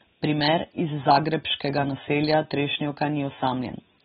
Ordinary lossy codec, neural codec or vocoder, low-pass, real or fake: AAC, 16 kbps; none; 19.8 kHz; real